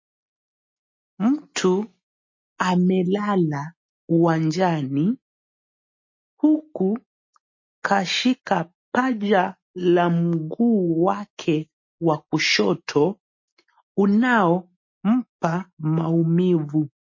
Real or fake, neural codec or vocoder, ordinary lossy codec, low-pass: real; none; MP3, 32 kbps; 7.2 kHz